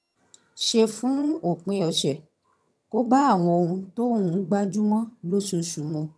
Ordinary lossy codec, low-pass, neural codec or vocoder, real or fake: none; none; vocoder, 22.05 kHz, 80 mel bands, HiFi-GAN; fake